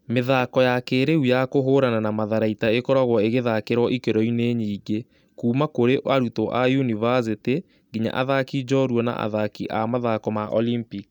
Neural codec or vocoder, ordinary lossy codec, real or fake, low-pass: none; none; real; 19.8 kHz